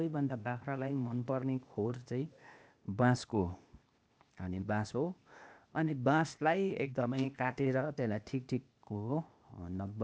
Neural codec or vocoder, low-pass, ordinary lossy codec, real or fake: codec, 16 kHz, 0.8 kbps, ZipCodec; none; none; fake